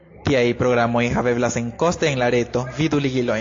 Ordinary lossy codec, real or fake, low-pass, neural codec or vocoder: AAC, 48 kbps; real; 7.2 kHz; none